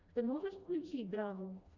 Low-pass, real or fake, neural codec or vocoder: 7.2 kHz; fake; codec, 16 kHz, 1 kbps, FreqCodec, smaller model